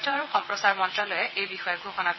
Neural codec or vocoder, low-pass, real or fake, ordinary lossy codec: vocoder, 22.05 kHz, 80 mel bands, WaveNeXt; 7.2 kHz; fake; MP3, 24 kbps